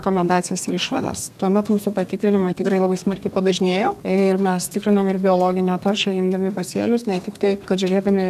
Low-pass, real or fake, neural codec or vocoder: 14.4 kHz; fake; codec, 32 kHz, 1.9 kbps, SNAC